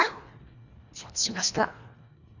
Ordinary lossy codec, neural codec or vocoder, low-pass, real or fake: none; codec, 24 kHz, 1.5 kbps, HILCodec; 7.2 kHz; fake